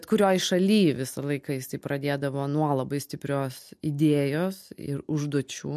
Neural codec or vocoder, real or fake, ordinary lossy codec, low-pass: none; real; MP3, 64 kbps; 14.4 kHz